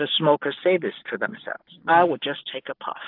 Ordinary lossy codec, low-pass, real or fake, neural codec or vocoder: AAC, 48 kbps; 5.4 kHz; real; none